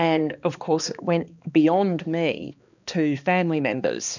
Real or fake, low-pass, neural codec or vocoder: fake; 7.2 kHz; codec, 16 kHz, 2 kbps, X-Codec, HuBERT features, trained on balanced general audio